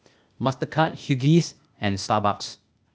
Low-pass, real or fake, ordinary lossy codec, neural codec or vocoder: none; fake; none; codec, 16 kHz, 0.8 kbps, ZipCodec